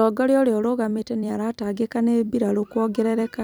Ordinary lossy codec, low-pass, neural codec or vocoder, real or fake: none; none; vocoder, 44.1 kHz, 128 mel bands every 256 samples, BigVGAN v2; fake